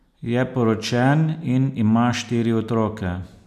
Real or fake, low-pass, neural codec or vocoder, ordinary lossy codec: real; 14.4 kHz; none; none